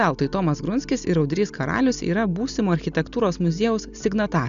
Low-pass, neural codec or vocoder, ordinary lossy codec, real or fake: 7.2 kHz; none; MP3, 96 kbps; real